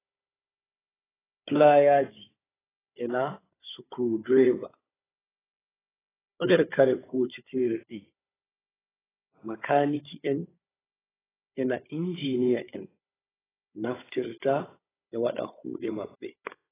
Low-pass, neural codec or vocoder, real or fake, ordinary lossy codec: 3.6 kHz; codec, 16 kHz, 16 kbps, FunCodec, trained on Chinese and English, 50 frames a second; fake; AAC, 16 kbps